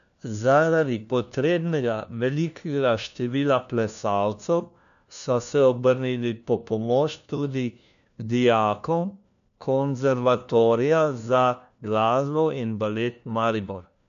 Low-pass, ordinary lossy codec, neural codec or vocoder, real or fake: 7.2 kHz; none; codec, 16 kHz, 1 kbps, FunCodec, trained on LibriTTS, 50 frames a second; fake